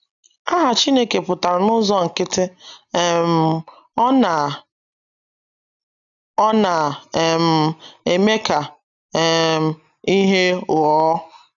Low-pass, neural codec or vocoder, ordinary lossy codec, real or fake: 7.2 kHz; none; none; real